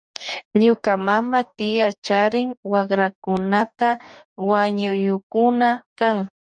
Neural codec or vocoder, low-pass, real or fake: codec, 44.1 kHz, 2.6 kbps, DAC; 9.9 kHz; fake